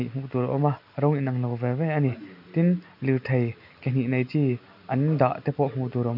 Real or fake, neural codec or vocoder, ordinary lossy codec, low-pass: real; none; none; 5.4 kHz